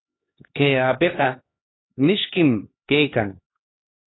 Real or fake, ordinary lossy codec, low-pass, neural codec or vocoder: fake; AAC, 16 kbps; 7.2 kHz; codec, 16 kHz, 2 kbps, X-Codec, HuBERT features, trained on LibriSpeech